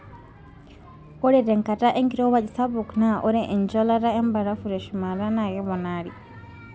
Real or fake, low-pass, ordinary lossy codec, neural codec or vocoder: real; none; none; none